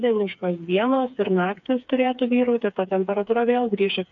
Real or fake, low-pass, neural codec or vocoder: fake; 7.2 kHz; codec, 16 kHz, 4 kbps, FreqCodec, smaller model